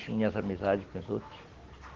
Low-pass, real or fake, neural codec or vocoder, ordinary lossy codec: 7.2 kHz; real; none; Opus, 16 kbps